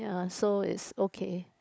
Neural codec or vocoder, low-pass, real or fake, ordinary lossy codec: codec, 16 kHz, 16 kbps, FunCodec, trained on LibriTTS, 50 frames a second; none; fake; none